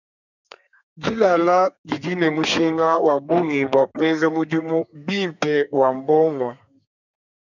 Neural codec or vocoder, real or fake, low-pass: codec, 32 kHz, 1.9 kbps, SNAC; fake; 7.2 kHz